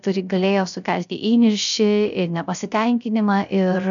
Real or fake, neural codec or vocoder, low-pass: fake; codec, 16 kHz, 0.3 kbps, FocalCodec; 7.2 kHz